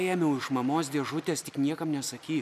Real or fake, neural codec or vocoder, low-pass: real; none; 14.4 kHz